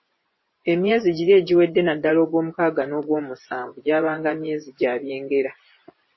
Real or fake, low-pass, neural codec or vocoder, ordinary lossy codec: fake; 7.2 kHz; vocoder, 24 kHz, 100 mel bands, Vocos; MP3, 24 kbps